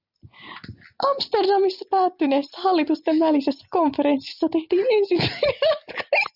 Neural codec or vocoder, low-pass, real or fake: none; 5.4 kHz; real